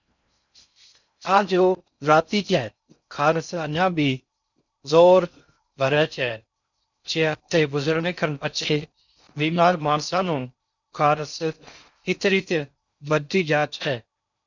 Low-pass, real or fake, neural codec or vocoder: 7.2 kHz; fake; codec, 16 kHz in and 24 kHz out, 0.6 kbps, FocalCodec, streaming, 4096 codes